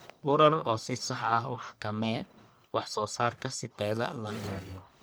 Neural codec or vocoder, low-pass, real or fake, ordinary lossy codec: codec, 44.1 kHz, 1.7 kbps, Pupu-Codec; none; fake; none